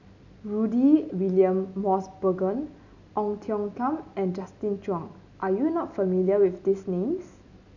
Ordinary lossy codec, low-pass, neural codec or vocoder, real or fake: none; 7.2 kHz; none; real